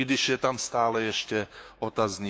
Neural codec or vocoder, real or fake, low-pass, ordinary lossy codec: codec, 16 kHz, 6 kbps, DAC; fake; none; none